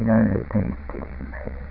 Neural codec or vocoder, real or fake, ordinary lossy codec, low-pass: vocoder, 22.05 kHz, 80 mel bands, WaveNeXt; fake; none; 5.4 kHz